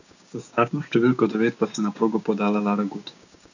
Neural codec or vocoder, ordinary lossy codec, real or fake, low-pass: codec, 16 kHz, 6 kbps, DAC; none; fake; 7.2 kHz